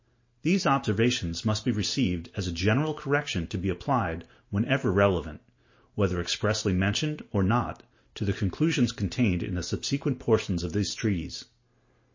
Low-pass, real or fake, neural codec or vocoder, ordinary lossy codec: 7.2 kHz; real; none; MP3, 32 kbps